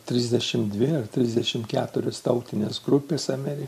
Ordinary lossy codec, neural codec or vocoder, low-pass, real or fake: MP3, 64 kbps; none; 14.4 kHz; real